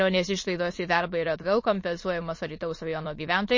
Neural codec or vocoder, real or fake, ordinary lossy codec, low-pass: autoencoder, 22.05 kHz, a latent of 192 numbers a frame, VITS, trained on many speakers; fake; MP3, 32 kbps; 7.2 kHz